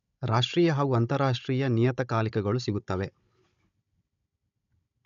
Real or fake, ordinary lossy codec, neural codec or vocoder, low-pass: fake; none; codec, 16 kHz, 16 kbps, FunCodec, trained on Chinese and English, 50 frames a second; 7.2 kHz